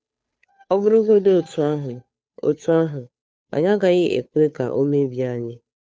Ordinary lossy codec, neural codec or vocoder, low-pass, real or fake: none; codec, 16 kHz, 2 kbps, FunCodec, trained on Chinese and English, 25 frames a second; none; fake